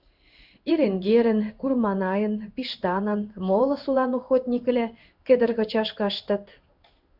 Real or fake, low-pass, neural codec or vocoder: fake; 5.4 kHz; codec, 16 kHz in and 24 kHz out, 1 kbps, XY-Tokenizer